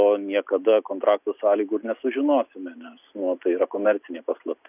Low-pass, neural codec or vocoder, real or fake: 3.6 kHz; none; real